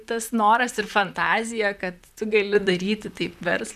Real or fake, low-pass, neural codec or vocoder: fake; 14.4 kHz; vocoder, 44.1 kHz, 128 mel bands, Pupu-Vocoder